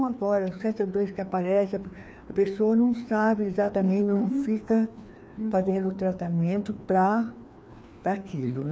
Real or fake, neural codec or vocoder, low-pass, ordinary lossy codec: fake; codec, 16 kHz, 2 kbps, FreqCodec, larger model; none; none